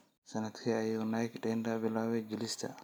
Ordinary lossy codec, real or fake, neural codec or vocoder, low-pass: none; real; none; none